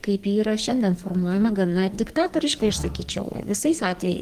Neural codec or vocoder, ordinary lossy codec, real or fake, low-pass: codec, 44.1 kHz, 2.6 kbps, SNAC; Opus, 24 kbps; fake; 14.4 kHz